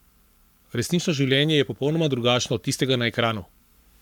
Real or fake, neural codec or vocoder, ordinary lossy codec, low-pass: fake; codec, 44.1 kHz, 7.8 kbps, Pupu-Codec; none; 19.8 kHz